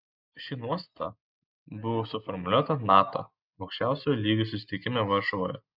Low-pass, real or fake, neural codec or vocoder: 5.4 kHz; real; none